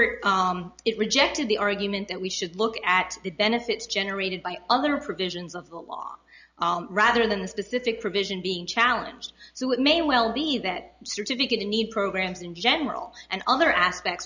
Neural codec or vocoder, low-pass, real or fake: none; 7.2 kHz; real